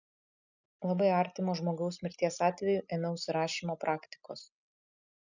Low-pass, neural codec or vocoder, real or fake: 7.2 kHz; none; real